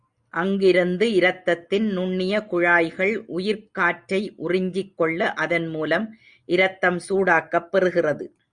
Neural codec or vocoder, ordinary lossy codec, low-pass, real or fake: none; Opus, 64 kbps; 9.9 kHz; real